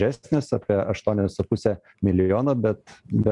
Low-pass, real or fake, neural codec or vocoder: 10.8 kHz; real; none